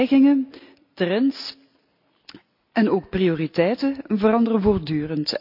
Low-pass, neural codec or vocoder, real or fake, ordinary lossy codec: 5.4 kHz; none; real; none